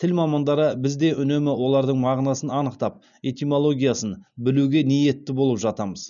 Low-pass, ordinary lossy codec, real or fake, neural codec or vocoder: 7.2 kHz; none; real; none